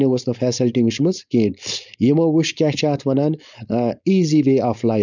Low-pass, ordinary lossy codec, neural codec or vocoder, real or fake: 7.2 kHz; none; codec, 16 kHz, 4.8 kbps, FACodec; fake